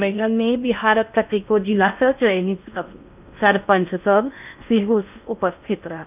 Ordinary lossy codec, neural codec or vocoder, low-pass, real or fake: none; codec, 16 kHz in and 24 kHz out, 0.6 kbps, FocalCodec, streaming, 2048 codes; 3.6 kHz; fake